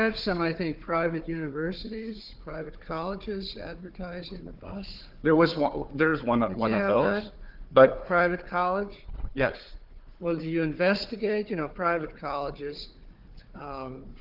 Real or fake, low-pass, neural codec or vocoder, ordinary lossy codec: fake; 5.4 kHz; codec, 16 kHz, 4 kbps, FunCodec, trained on Chinese and English, 50 frames a second; Opus, 32 kbps